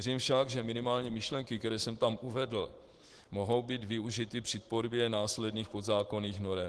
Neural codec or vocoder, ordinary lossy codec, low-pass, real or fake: autoencoder, 48 kHz, 128 numbers a frame, DAC-VAE, trained on Japanese speech; Opus, 16 kbps; 10.8 kHz; fake